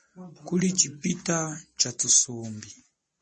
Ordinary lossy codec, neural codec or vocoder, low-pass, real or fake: MP3, 32 kbps; none; 10.8 kHz; real